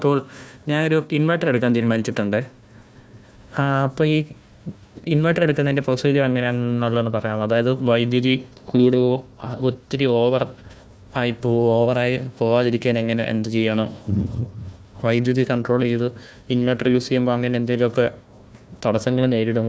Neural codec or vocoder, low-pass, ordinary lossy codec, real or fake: codec, 16 kHz, 1 kbps, FunCodec, trained on Chinese and English, 50 frames a second; none; none; fake